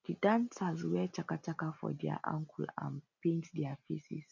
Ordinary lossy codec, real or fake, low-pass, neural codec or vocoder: none; real; 7.2 kHz; none